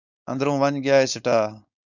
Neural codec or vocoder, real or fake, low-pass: codec, 16 kHz, 4.8 kbps, FACodec; fake; 7.2 kHz